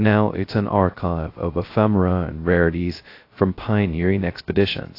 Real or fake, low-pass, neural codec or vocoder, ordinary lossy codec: fake; 5.4 kHz; codec, 16 kHz, 0.2 kbps, FocalCodec; AAC, 32 kbps